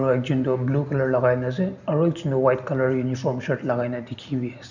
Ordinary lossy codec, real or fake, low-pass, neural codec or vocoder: none; real; 7.2 kHz; none